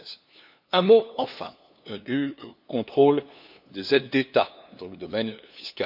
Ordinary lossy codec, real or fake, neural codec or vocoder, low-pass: none; fake; codec, 16 kHz, 2 kbps, FunCodec, trained on LibriTTS, 25 frames a second; 5.4 kHz